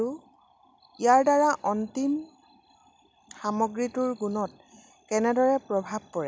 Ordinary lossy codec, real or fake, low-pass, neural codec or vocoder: none; real; none; none